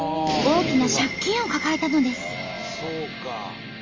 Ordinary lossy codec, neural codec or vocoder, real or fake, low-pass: Opus, 32 kbps; none; real; 7.2 kHz